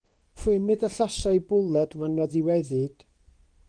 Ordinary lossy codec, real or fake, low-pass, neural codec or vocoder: Opus, 24 kbps; fake; 9.9 kHz; codec, 24 kHz, 0.9 kbps, WavTokenizer, medium speech release version 2